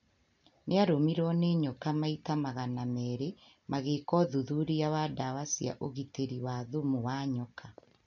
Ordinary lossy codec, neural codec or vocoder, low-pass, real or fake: none; none; 7.2 kHz; real